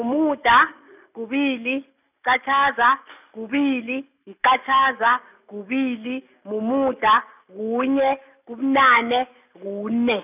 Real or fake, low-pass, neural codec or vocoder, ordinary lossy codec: real; 3.6 kHz; none; none